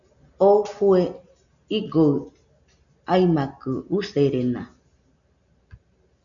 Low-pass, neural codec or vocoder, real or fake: 7.2 kHz; none; real